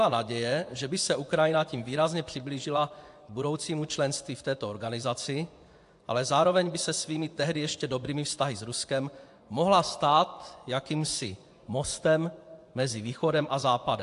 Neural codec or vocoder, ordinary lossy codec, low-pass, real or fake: vocoder, 24 kHz, 100 mel bands, Vocos; AAC, 64 kbps; 10.8 kHz; fake